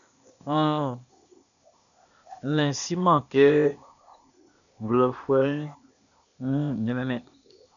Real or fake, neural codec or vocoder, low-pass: fake; codec, 16 kHz, 0.8 kbps, ZipCodec; 7.2 kHz